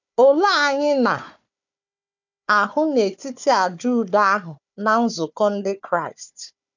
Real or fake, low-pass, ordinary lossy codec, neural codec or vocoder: fake; 7.2 kHz; none; codec, 16 kHz, 4 kbps, FunCodec, trained on Chinese and English, 50 frames a second